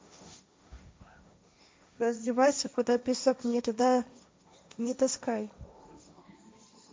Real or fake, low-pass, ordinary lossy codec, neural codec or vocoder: fake; none; none; codec, 16 kHz, 1.1 kbps, Voila-Tokenizer